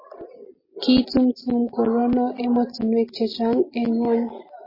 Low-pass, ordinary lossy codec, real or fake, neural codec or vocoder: 5.4 kHz; MP3, 24 kbps; real; none